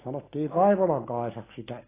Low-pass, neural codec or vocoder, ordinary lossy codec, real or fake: 3.6 kHz; none; AAC, 16 kbps; real